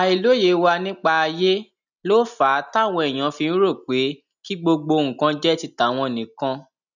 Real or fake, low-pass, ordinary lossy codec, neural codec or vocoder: real; 7.2 kHz; none; none